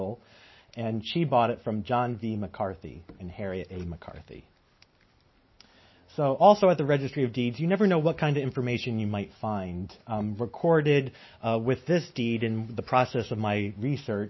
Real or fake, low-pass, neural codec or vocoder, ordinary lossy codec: real; 7.2 kHz; none; MP3, 24 kbps